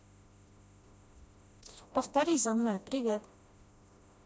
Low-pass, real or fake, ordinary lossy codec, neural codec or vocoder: none; fake; none; codec, 16 kHz, 1 kbps, FreqCodec, smaller model